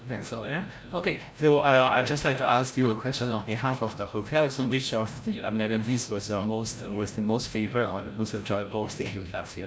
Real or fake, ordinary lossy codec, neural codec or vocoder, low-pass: fake; none; codec, 16 kHz, 0.5 kbps, FreqCodec, larger model; none